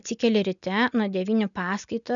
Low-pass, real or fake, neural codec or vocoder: 7.2 kHz; real; none